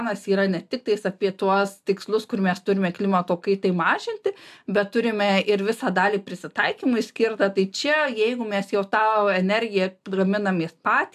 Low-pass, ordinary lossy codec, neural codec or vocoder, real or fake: 14.4 kHz; AAC, 96 kbps; none; real